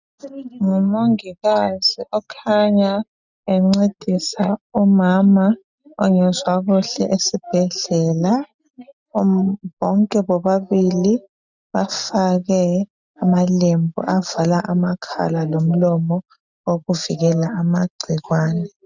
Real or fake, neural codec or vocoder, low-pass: real; none; 7.2 kHz